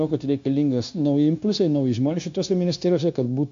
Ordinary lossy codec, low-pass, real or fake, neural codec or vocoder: AAC, 64 kbps; 7.2 kHz; fake; codec, 16 kHz, 0.9 kbps, LongCat-Audio-Codec